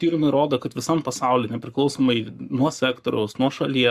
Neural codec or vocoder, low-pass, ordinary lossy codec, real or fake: codec, 44.1 kHz, 7.8 kbps, Pupu-Codec; 14.4 kHz; Opus, 64 kbps; fake